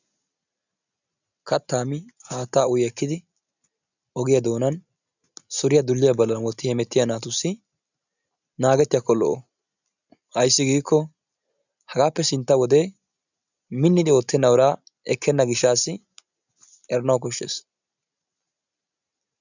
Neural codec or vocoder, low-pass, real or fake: none; 7.2 kHz; real